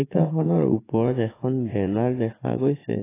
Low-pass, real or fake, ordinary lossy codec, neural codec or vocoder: 3.6 kHz; fake; AAC, 16 kbps; vocoder, 44.1 kHz, 80 mel bands, Vocos